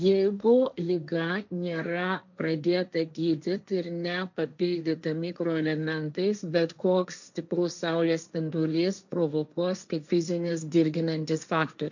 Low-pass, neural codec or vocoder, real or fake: 7.2 kHz; codec, 16 kHz, 1.1 kbps, Voila-Tokenizer; fake